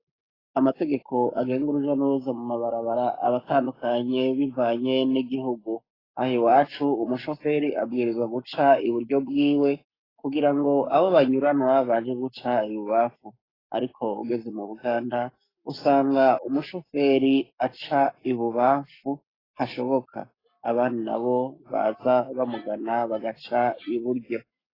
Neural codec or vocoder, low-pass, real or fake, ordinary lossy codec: codec, 44.1 kHz, 7.8 kbps, Pupu-Codec; 5.4 kHz; fake; AAC, 24 kbps